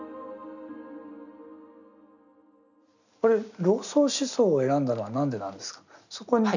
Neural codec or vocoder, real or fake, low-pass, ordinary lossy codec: none; real; 7.2 kHz; none